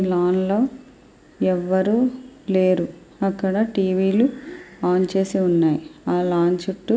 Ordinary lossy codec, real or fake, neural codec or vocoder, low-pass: none; real; none; none